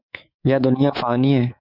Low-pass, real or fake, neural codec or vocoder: 5.4 kHz; fake; codec, 24 kHz, 3.1 kbps, DualCodec